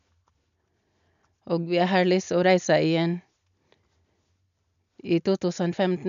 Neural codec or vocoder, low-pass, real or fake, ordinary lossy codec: none; 7.2 kHz; real; none